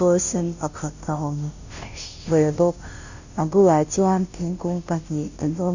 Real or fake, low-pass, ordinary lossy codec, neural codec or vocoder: fake; 7.2 kHz; none; codec, 16 kHz, 0.5 kbps, FunCodec, trained on Chinese and English, 25 frames a second